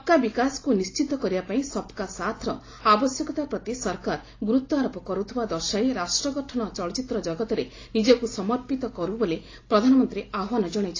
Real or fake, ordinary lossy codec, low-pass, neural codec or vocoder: real; AAC, 32 kbps; 7.2 kHz; none